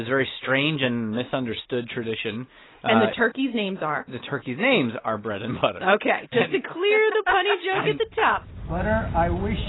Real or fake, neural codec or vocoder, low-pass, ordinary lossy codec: real; none; 7.2 kHz; AAC, 16 kbps